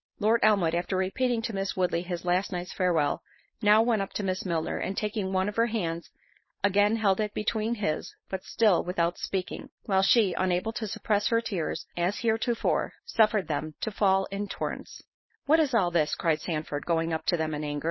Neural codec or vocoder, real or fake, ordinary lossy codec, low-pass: codec, 16 kHz, 4.8 kbps, FACodec; fake; MP3, 24 kbps; 7.2 kHz